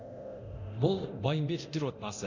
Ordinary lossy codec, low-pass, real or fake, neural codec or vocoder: none; 7.2 kHz; fake; codec, 24 kHz, 0.9 kbps, DualCodec